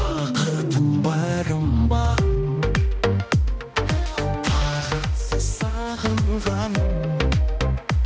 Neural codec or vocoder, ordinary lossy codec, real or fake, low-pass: codec, 16 kHz, 1 kbps, X-Codec, HuBERT features, trained on balanced general audio; none; fake; none